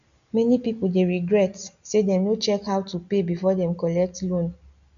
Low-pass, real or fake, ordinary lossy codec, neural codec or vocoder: 7.2 kHz; real; none; none